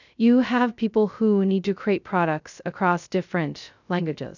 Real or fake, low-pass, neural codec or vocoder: fake; 7.2 kHz; codec, 16 kHz, 0.2 kbps, FocalCodec